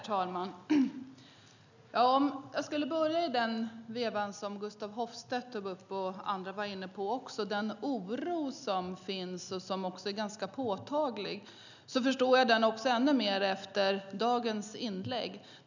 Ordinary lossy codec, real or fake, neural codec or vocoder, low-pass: none; real; none; 7.2 kHz